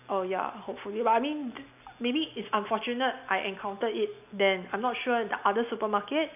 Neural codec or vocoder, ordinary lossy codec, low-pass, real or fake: none; none; 3.6 kHz; real